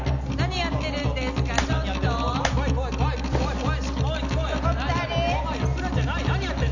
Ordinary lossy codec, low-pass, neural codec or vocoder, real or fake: none; 7.2 kHz; none; real